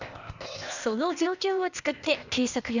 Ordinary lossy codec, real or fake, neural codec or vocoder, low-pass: none; fake; codec, 16 kHz, 0.8 kbps, ZipCodec; 7.2 kHz